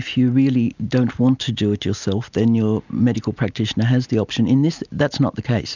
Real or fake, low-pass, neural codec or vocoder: real; 7.2 kHz; none